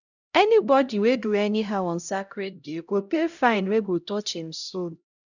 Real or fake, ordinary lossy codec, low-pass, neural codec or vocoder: fake; none; 7.2 kHz; codec, 16 kHz, 0.5 kbps, X-Codec, HuBERT features, trained on LibriSpeech